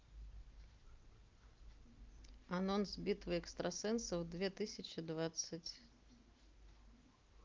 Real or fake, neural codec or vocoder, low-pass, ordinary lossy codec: real; none; 7.2 kHz; Opus, 24 kbps